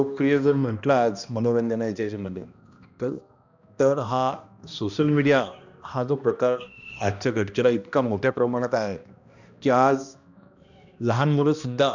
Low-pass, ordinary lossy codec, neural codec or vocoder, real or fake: 7.2 kHz; none; codec, 16 kHz, 1 kbps, X-Codec, HuBERT features, trained on balanced general audio; fake